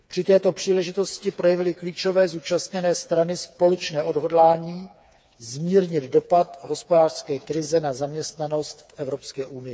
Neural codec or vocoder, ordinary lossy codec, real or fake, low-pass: codec, 16 kHz, 4 kbps, FreqCodec, smaller model; none; fake; none